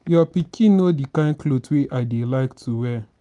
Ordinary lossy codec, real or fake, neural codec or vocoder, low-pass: none; real; none; 10.8 kHz